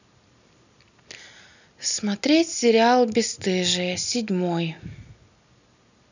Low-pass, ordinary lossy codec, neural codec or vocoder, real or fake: 7.2 kHz; none; none; real